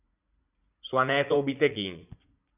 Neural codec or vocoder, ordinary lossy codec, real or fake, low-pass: vocoder, 44.1 kHz, 80 mel bands, Vocos; AAC, 32 kbps; fake; 3.6 kHz